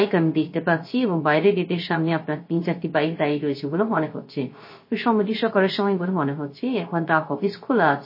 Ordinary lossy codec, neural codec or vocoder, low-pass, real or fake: MP3, 24 kbps; codec, 16 kHz, 0.3 kbps, FocalCodec; 5.4 kHz; fake